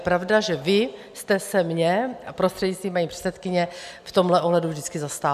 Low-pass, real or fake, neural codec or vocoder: 14.4 kHz; real; none